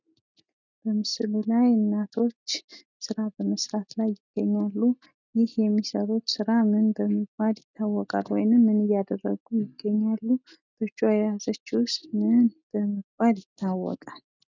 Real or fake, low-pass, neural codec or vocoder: real; 7.2 kHz; none